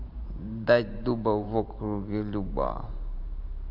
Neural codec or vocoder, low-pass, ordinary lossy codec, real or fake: vocoder, 44.1 kHz, 128 mel bands every 512 samples, BigVGAN v2; 5.4 kHz; AAC, 48 kbps; fake